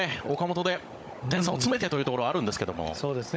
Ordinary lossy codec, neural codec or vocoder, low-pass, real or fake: none; codec, 16 kHz, 16 kbps, FunCodec, trained on Chinese and English, 50 frames a second; none; fake